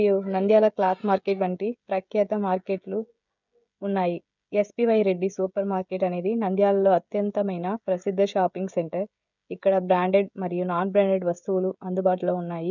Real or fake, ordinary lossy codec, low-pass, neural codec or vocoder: fake; none; 7.2 kHz; codec, 16 kHz, 16 kbps, FreqCodec, smaller model